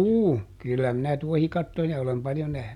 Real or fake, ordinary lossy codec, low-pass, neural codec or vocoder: real; none; 19.8 kHz; none